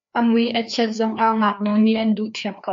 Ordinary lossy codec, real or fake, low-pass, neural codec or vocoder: AAC, 64 kbps; fake; 7.2 kHz; codec, 16 kHz, 2 kbps, FreqCodec, larger model